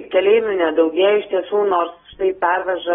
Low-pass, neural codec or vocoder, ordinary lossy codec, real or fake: 19.8 kHz; none; AAC, 16 kbps; real